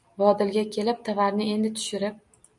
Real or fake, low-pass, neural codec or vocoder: real; 10.8 kHz; none